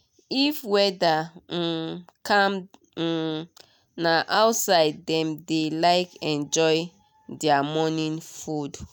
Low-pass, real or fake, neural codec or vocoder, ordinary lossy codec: none; real; none; none